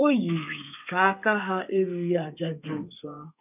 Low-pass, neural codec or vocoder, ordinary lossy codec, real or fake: 3.6 kHz; codec, 32 kHz, 1.9 kbps, SNAC; none; fake